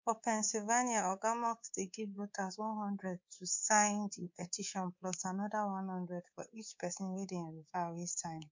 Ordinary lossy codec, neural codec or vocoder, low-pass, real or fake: MP3, 48 kbps; codec, 24 kHz, 3.1 kbps, DualCodec; 7.2 kHz; fake